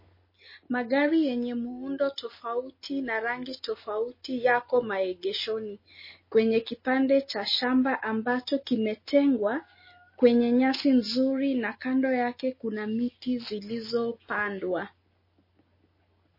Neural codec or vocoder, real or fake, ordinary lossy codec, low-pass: none; real; MP3, 24 kbps; 5.4 kHz